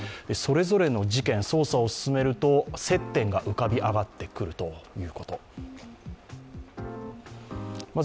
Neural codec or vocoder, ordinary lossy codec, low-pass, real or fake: none; none; none; real